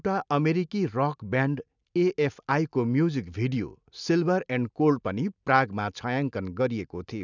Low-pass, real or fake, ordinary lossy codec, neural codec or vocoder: 7.2 kHz; real; none; none